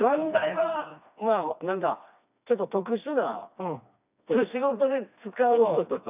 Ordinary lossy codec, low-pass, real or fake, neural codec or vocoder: none; 3.6 kHz; fake; codec, 16 kHz, 2 kbps, FreqCodec, smaller model